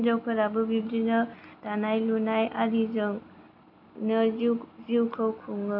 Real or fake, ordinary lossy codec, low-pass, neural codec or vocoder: real; none; 5.4 kHz; none